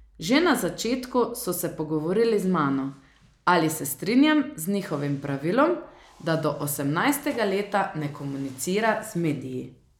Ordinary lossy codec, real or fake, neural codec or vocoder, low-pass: none; real; none; 19.8 kHz